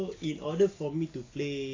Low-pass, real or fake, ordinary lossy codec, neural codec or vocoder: 7.2 kHz; real; AAC, 32 kbps; none